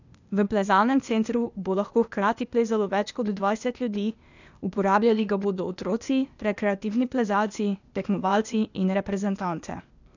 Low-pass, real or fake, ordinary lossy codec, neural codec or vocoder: 7.2 kHz; fake; none; codec, 16 kHz, 0.8 kbps, ZipCodec